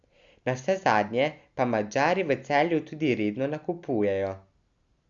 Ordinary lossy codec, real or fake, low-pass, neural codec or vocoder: Opus, 64 kbps; real; 7.2 kHz; none